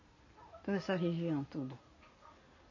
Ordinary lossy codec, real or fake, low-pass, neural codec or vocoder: MP3, 32 kbps; real; 7.2 kHz; none